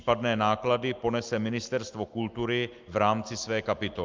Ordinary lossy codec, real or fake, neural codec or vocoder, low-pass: Opus, 24 kbps; real; none; 7.2 kHz